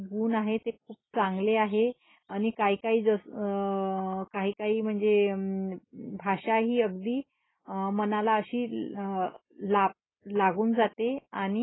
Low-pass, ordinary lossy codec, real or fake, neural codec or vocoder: 7.2 kHz; AAC, 16 kbps; real; none